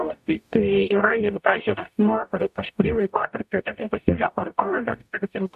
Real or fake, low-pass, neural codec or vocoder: fake; 14.4 kHz; codec, 44.1 kHz, 0.9 kbps, DAC